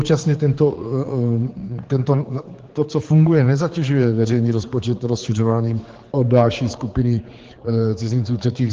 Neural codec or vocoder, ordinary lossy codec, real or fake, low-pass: codec, 16 kHz, 4 kbps, X-Codec, HuBERT features, trained on general audio; Opus, 16 kbps; fake; 7.2 kHz